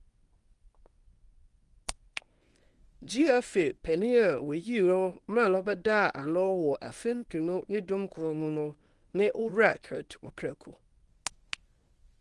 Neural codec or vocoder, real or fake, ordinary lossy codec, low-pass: codec, 24 kHz, 0.9 kbps, WavTokenizer, medium speech release version 1; fake; Opus, 32 kbps; 10.8 kHz